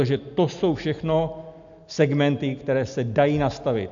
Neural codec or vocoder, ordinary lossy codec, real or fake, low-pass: none; AAC, 64 kbps; real; 7.2 kHz